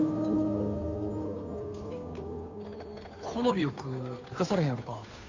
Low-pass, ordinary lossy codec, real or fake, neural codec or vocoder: 7.2 kHz; none; fake; codec, 16 kHz, 2 kbps, FunCodec, trained on Chinese and English, 25 frames a second